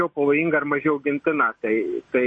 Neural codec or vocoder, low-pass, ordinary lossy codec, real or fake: none; 10.8 kHz; MP3, 32 kbps; real